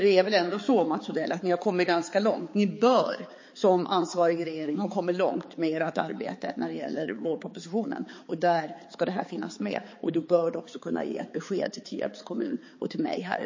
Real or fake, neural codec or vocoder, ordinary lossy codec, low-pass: fake; codec, 16 kHz, 4 kbps, X-Codec, HuBERT features, trained on balanced general audio; MP3, 32 kbps; 7.2 kHz